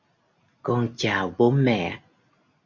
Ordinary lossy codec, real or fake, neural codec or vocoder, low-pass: MP3, 64 kbps; real; none; 7.2 kHz